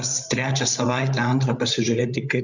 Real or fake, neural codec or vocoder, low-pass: fake; codec, 16 kHz, 8 kbps, FreqCodec, larger model; 7.2 kHz